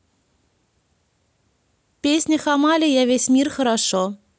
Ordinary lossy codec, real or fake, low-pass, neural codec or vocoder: none; real; none; none